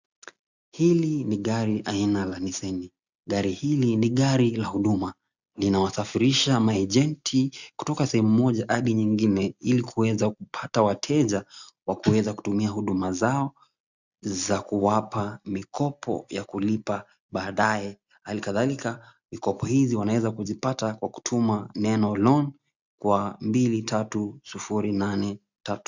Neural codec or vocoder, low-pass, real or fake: none; 7.2 kHz; real